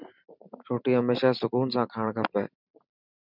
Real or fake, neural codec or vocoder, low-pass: fake; vocoder, 44.1 kHz, 128 mel bands every 512 samples, BigVGAN v2; 5.4 kHz